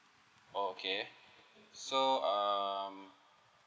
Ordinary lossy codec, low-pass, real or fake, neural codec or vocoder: none; none; real; none